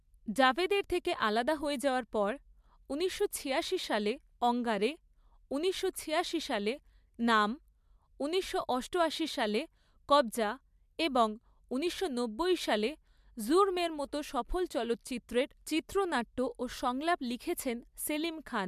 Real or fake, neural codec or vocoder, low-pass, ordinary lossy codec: real; none; 14.4 kHz; MP3, 96 kbps